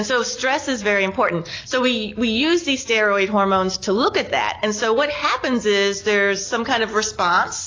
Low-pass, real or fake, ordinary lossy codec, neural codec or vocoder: 7.2 kHz; real; AAC, 32 kbps; none